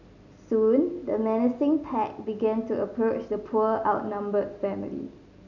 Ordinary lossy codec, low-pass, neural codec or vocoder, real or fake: none; 7.2 kHz; none; real